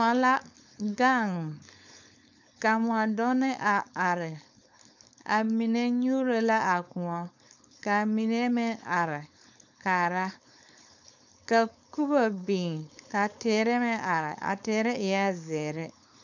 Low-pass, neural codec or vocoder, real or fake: 7.2 kHz; codec, 16 kHz, 4.8 kbps, FACodec; fake